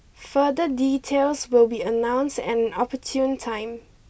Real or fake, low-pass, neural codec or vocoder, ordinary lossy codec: real; none; none; none